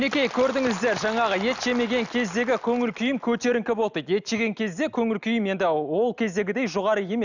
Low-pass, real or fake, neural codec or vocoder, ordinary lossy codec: 7.2 kHz; real; none; none